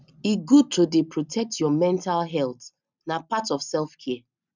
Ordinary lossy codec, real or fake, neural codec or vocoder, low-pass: none; real; none; 7.2 kHz